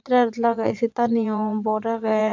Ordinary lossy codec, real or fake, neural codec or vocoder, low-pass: MP3, 64 kbps; fake; vocoder, 22.05 kHz, 80 mel bands, Vocos; 7.2 kHz